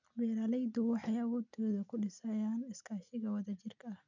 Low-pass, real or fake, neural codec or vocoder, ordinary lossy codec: 7.2 kHz; real; none; none